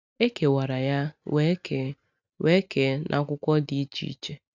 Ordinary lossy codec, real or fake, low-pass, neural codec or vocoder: none; real; 7.2 kHz; none